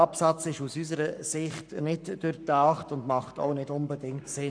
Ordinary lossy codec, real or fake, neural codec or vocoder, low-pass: none; fake; codec, 44.1 kHz, 7.8 kbps, Pupu-Codec; 9.9 kHz